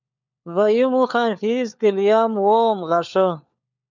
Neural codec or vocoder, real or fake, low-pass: codec, 16 kHz, 4 kbps, FunCodec, trained on LibriTTS, 50 frames a second; fake; 7.2 kHz